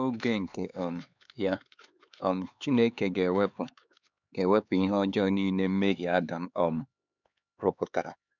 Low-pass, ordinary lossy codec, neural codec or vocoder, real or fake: 7.2 kHz; none; codec, 16 kHz, 4 kbps, X-Codec, HuBERT features, trained on LibriSpeech; fake